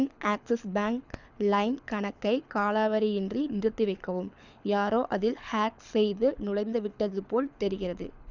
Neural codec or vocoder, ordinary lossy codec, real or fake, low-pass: codec, 24 kHz, 6 kbps, HILCodec; none; fake; 7.2 kHz